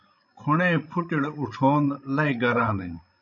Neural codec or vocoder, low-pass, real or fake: codec, 16 kHz, 16 kbps, FreqCodec, larger model; 7.2 kHz; fake